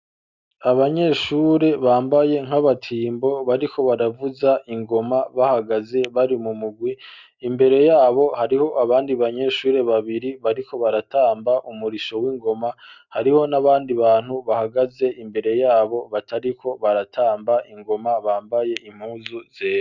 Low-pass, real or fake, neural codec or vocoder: 7.2 kHz; real; none